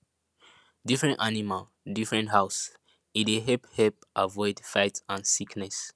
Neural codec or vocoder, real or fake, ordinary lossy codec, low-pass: none; real; none; none